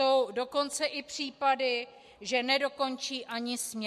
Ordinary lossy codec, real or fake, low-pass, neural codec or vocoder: MP3, 64 kbps; real; 14.4 kHz; none